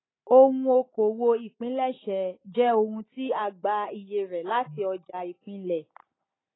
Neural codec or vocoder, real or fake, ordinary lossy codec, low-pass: none; real; AAC, 16 kbps; 7.2 kHz